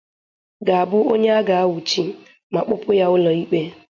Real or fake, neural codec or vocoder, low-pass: real; none; 7.2 kHz